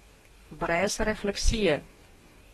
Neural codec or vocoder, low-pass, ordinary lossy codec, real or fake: codec, 44.1 kHz, 2.6 kbps, DAC; 19.8 kHz; AAC, 32 kbps; fake